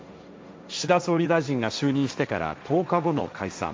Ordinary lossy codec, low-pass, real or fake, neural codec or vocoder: none; none; fake; codec, 16 kHz, 1.1 kbps, Voila-Tokenizer